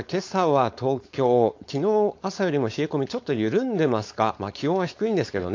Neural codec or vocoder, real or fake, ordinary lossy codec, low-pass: codec, 16 kHz, 4.8 kbps, FACodec; fake; AAC, 48 kbps; 7.2 kHz